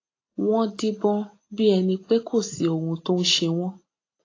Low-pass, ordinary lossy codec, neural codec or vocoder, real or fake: 7.2 kHz; AAC, 32 kbps; none; real